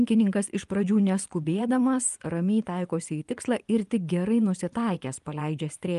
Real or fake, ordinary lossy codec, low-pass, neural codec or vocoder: fake; Opus, 32 kbps; 10.8 kHz; vocoder, 24 kHz, 100 mel bands, Vocos